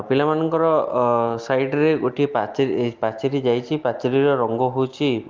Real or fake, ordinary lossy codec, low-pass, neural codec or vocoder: real; Opus, 32 kbps; 7.2 kHz; none